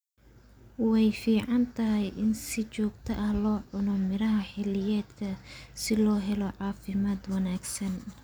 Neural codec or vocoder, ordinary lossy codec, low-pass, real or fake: none; none; none; real